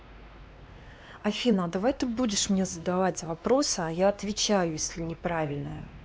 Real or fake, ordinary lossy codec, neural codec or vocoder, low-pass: fake; none; codec, 16 kHz, 2 kbps, X-Codec, WavLM features, trained on Multilingual LibriSpeech; none